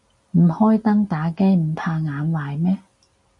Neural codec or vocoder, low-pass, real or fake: none; 10.8 kHz; real